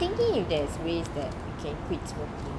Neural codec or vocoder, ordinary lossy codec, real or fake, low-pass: none; none; real; none